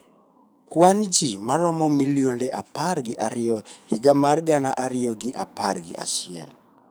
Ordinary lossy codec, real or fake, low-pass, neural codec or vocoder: none; fake; none; codec, 44.1 kHz, 2.6 kbps, SNAC